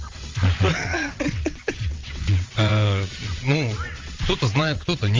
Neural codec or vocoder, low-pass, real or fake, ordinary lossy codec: vocoder, 22.05 kHz, 80 mel bands, WaveNeXt; 7.2 kHz; fake; Opus, 32 kbps